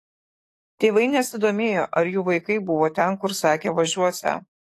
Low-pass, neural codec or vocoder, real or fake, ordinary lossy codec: 14.4 kHz; codec, 44.1 kHz, 7.8 kbps, DAC; fake; AAC, 48 kbps